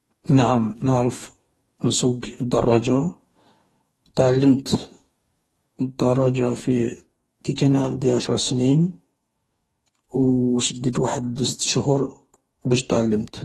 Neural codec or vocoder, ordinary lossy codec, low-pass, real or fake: codec, 44.1 kHz, 2.6 kbps, DAC; AAC, 32 kbps; 19.8 kHz; fake